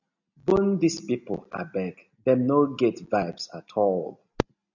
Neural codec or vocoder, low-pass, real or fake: none; 7.2 kHz; real